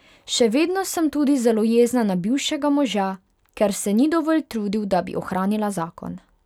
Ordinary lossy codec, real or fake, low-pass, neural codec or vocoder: none; real; 19.8 kHz; none